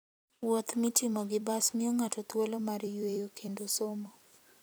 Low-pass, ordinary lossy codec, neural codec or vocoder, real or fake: none; none; vocoder, 44.1 kHz, 128 mel bands every 256 samples, BigVGAN v2; fake